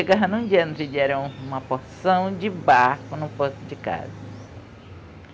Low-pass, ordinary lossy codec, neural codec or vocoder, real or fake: none; none; none; real